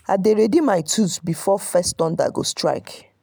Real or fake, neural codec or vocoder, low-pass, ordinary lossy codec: real; none; none; none